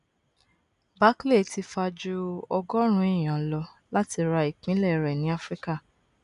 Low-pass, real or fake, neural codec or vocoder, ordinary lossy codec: 10.8 kHz; real; none; none